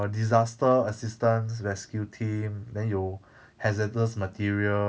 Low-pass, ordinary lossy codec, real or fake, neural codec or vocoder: none; none; real; none